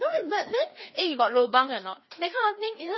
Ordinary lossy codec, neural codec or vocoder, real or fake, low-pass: MP3, 24 kbps; codec, 16 kHz, 2 kbps, FreqCodec, larger model; fake; 7.2 kHz